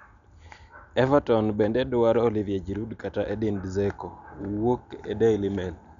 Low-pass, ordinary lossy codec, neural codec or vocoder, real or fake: 7.2 kHz; none; none; real